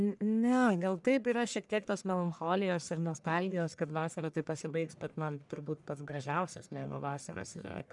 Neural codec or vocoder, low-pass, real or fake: codec, 44.1 kHz, 1.7 kbps, Pupu-Codec; 10.8 kHz; fake